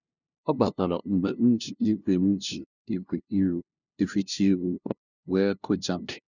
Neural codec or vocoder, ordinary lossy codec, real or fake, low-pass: codec, 16 kHz, 0.5 kbps, FunCodec, trained on LibriTTS, 25 frames a second; none; fake; 7.2 kHz